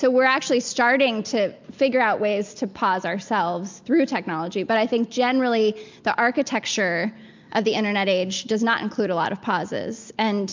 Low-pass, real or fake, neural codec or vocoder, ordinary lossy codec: 7.2 kHz; real; none; MP3, 64 kbps